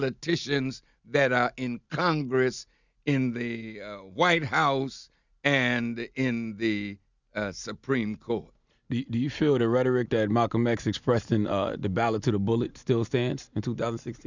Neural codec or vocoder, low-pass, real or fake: none; 7.2 kHz; real